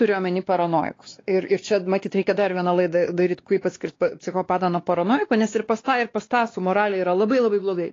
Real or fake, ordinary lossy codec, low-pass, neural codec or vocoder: fake; AAC, 32 kbps; 7.2 kHz; codec, 16 kHz, 2 kbps, X-Codec, WavLM features, trained on Multilingual LibriSpeech